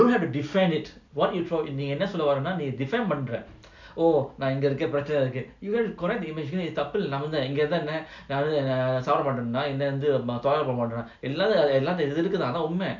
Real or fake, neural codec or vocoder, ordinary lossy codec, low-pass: real; none; none; 7.2 kHz